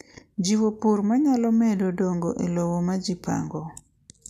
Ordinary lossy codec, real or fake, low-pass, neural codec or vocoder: none; real; 14.4 kHz; none